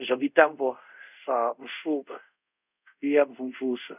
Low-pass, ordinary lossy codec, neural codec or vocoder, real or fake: 3.6 kHz; none; codec, 24 kHz, 0.5 kbps, DualCodec; fake